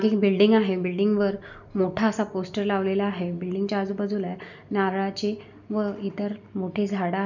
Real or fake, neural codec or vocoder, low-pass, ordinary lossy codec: real; none; 7.2 kHz; none